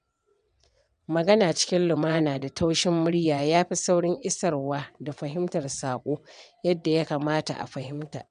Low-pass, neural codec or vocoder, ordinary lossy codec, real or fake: 9.9 kHz; vocoder, 22.05 kHz, 80 mel bands, WaveNeXt; none; fake